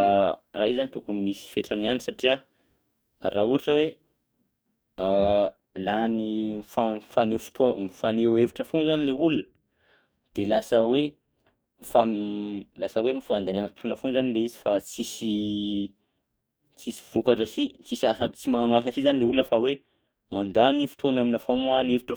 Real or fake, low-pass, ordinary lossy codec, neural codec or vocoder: fake; none; none; codec, 44.1 kHz, 2.6 kbps, DAC